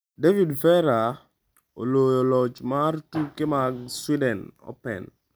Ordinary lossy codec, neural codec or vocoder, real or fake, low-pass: none; none; real; none